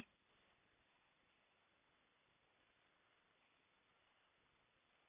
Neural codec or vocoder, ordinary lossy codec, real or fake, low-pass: none; Opus, 64 kbps; real; 3.6 kHz